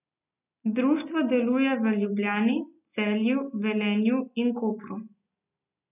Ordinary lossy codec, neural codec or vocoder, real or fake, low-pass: none; none; real; 3.6 kHz